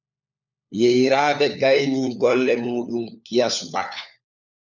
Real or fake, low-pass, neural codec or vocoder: fake; 7.2 kHz; codec, 16 kHz, 4 kbps, FunCodec, trained on LibriTTS, 50 frames a second